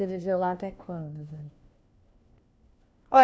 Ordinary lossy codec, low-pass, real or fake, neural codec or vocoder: none; none; fake; codec, 16 kHz, 1 kbps, FunCodec, trained on LibriTTS, 50 frames a second